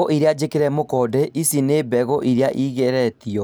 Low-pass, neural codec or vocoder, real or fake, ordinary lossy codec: none; none; real; none